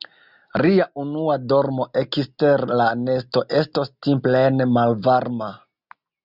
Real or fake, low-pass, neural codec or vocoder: real; 5.4 kHz; none